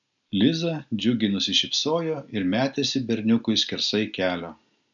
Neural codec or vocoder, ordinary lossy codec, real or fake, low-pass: none; MP3, 96 kbps; real; 7.2 kHz